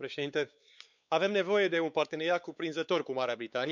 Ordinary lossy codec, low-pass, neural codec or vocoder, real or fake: none; 7.2 kHz; codec, 16 kHz, 4 kbps, X-Codec, WavLM features, trained on Multilingual LibriSpeech; fake